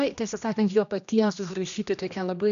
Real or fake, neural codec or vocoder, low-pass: fake; codec, 16 kHz, 1 kbps, X-Codec, HuBERT features, trained on general audio; 7.2 kHz